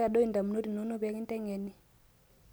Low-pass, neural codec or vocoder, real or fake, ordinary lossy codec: none; none; real; none